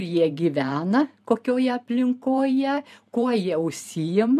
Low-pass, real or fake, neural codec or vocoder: 14.4 kHz; fake; vocoder, 44.1 kHz, 128 mel bands every 512 samples, BigVGAN v2